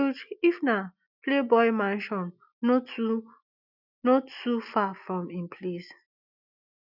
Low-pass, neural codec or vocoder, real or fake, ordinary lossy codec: 5.4 kHz; none; real; none